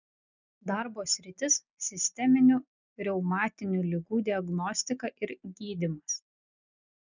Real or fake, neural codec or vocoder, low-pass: real; none; 7.2 kHz